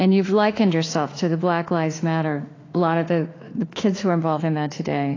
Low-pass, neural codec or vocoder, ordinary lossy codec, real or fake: 7.2 kHz; autoencoder, 48 kHz, 32 numbers a frame, DAC-VAE, trained on Japanese speech; AAC, 32 kbps; fake